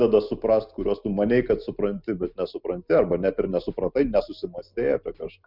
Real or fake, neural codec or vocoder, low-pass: real; none; 5.4 kHz